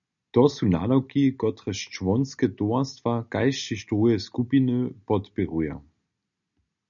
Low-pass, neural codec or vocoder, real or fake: 7.2 kHz; none; real